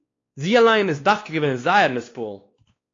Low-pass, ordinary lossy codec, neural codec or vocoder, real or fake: 7.2 kHz; AAC, 32 kbps; codec, 16 kHz, 2 kbps, X-Codec, WavLM features, trained on Multilingual LibriSpeech; fake